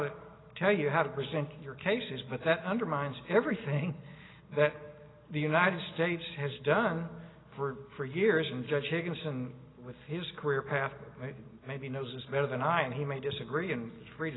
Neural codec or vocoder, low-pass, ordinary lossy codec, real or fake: none; 7.2 kHz; AAC, 16 kbps; real